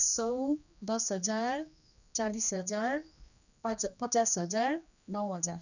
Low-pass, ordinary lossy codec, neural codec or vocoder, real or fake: 7.2 kHz; none; codec, 16 kHz, 2 kbps, X-Codec, HuBERT features, trained on general audio; fake